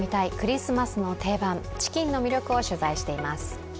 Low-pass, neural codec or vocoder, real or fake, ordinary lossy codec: none; none; real; none